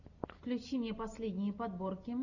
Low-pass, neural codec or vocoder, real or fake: 7.2 kHz; none; real